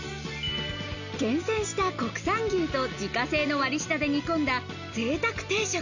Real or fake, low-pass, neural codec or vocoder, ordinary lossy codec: real; 7.2 kHz; none; none